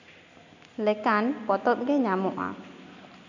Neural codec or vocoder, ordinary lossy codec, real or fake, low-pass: none; none; real; 7.2 kHz